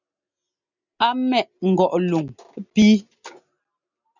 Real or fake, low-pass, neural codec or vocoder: real; 7.2 kHz; none